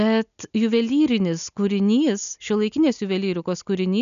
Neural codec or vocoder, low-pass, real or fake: none; 7.2 kHz; real